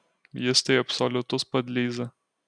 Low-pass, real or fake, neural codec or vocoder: 9.9 kHz; real; none